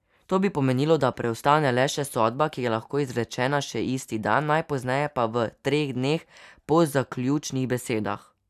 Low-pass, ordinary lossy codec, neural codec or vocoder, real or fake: 14.4 kHz; none; none; real